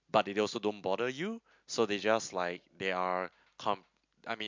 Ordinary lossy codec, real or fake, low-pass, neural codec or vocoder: MP3, 64 kbps; real; 7.2 kHz; none